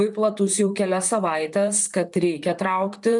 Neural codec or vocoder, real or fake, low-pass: vocoder, 44.1 kHz, 128 mel bands, Pupu-Vocoder; fake; 10.8 kHz